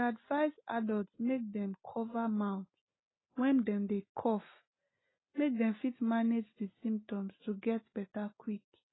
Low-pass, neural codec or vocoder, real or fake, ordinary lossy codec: 7.2 kHz; none; real; AAC, 16 kbps